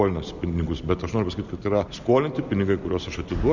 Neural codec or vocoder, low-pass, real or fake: none; 7.2 kHz; real